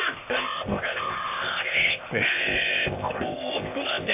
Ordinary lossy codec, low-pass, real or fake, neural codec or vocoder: MP3, 24 kbps; 3.6 kHz; fake; codec, 16 kHz, 0.8 kbps, ZipCodec